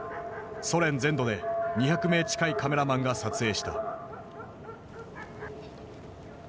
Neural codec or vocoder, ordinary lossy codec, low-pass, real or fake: none; none; none; real